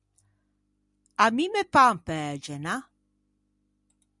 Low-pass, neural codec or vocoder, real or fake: 10.8 kHz; none; real